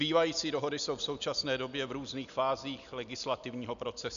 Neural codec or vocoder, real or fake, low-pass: none; real; 7.2 kHz